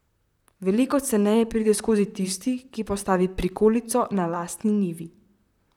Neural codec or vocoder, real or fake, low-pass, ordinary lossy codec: vocoder, 44.1 kHz, 128 mel bands, Pupu-Vocoder; fake; 19.8 kHz; none